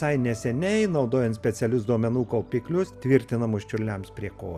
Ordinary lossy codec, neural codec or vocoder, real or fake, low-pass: Opus, 64 kbps; none; real; 14.4 kHz